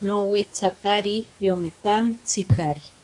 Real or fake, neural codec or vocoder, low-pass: fake; codec, 24 kHz, 1 kbps, SNAC; 10.8 kHz